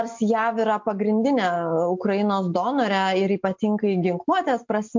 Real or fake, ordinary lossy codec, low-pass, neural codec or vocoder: real; MP3, 48 kbps; 7.2 kHz; none